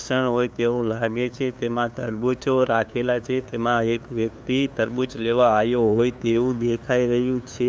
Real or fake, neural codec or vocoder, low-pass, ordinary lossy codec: fake; codec, 16 kHz, 2 kbps, FunCodec, trained on LibriTTS, 25 frames a second; none; none